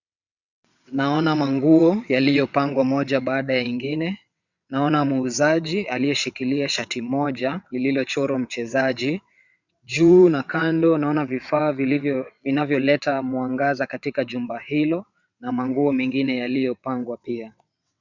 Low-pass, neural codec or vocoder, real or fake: 7.2 kHz; vocoder, 22.05 kHz, 80 mel bands, WaveNeXt; fake